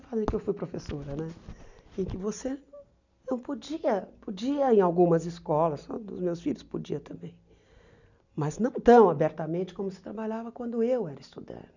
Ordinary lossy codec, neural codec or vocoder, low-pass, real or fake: none; none; 7.2 kHz; real